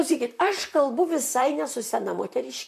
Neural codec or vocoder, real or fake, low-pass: vocoder, 44.1 kHz, 128 mel bands, Pupu-Vocoder; fake; 14.4 kHz